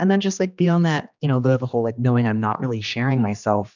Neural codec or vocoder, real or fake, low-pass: codec, 16 kHz, 2 kbps, X-Codec, HuBERT features, trained on general audio; fake; 7.2 kHz